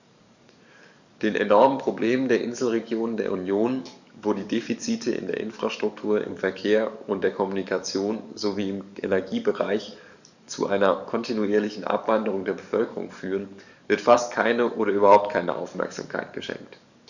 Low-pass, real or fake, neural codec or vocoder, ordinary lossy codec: 7.2 kHz; fake; codec, 44.1 kHz, 7.8 kbps, DAC; none